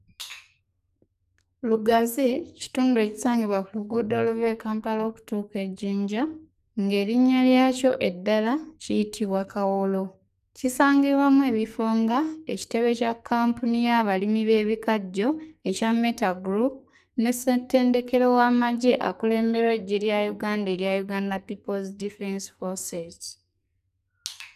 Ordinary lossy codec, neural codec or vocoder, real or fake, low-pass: none; codec, 44.1 kHz, 2.6 kbps, SNAC; fake; 14.4 kHz